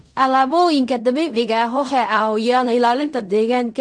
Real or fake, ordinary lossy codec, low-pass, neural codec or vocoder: fake; AAC, 64 kbps; 9.9 kHz; codec, 16 kHz in and 24 kHz out, 0.4 kbps, LongCat-Audio-Codec, fine tuned four codebook decoder